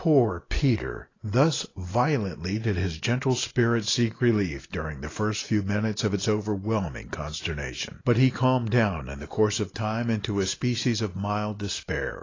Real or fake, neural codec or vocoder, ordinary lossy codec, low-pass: real; none; AAC, 32 kbps; 7.2 kHz